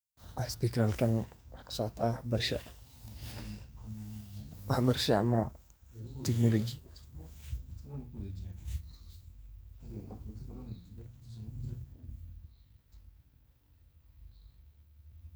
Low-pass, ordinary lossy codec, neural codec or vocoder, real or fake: none; none; codec, 44.1 kHz, 2.6 kbps, SNAC; fake